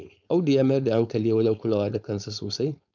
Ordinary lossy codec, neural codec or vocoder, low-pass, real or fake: none; codec, 16 kHz, 4.8 kbps, FACodec; 7.2 kHz; fake